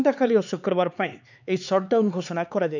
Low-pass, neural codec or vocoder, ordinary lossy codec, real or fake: 7.2 kHz; codec, 16 kHz, 4 kbps, X-Codec, HuBERT features, trained on LibriSpeech; none; fake